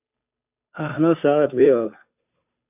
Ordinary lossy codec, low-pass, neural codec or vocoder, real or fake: AAC, 32 kbps; 3.6 kHz; codec, 16 kHz, 2 kbps, FunCodec, trained on Chinese and English, 25 frames a second; fake